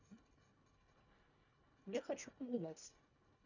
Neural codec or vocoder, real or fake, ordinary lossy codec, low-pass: codec, 24 kHz, 1.5 kbps, HILCodec; fake; none; 7.2 kHz